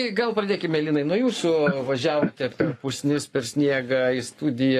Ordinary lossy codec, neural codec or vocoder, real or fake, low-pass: AAC, 48 kbps; codec, 44.1 kHz, 7.8 kbps, Pupu-Codec; fake; 14.4 kHz